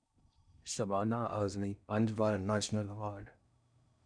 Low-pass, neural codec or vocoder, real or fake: 9.9 kHz; codec, 16 kHz in and 24 kHz out, 0.6 kbps, FocalCodec, streaming, 4096 codes; fake